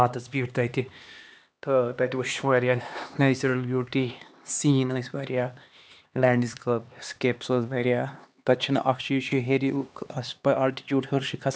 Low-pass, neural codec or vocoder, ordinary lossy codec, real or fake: none; codec, 16 kHz, 2 kbps, X-Codec, HuBERT features, trained on LibriSpeech; none; fake